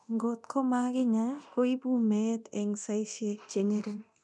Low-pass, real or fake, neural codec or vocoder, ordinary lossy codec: 10.8 kHz; fake; codec, 24 kHz, 0.9 kbps, DualCodec; none